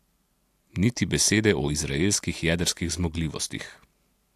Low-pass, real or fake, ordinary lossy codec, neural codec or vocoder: 14.4 kHz; real; AAC, 64 kbps; none